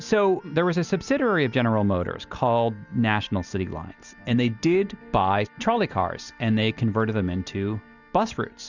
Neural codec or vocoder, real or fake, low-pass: none; real; 7.2 kHz